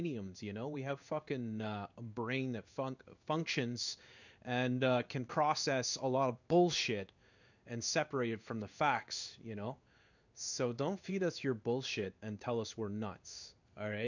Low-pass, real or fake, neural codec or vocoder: 7.2 kHz; fake; codec, 16 kHz in and 24 kHz out, 1 kbps, XY-Tokenizer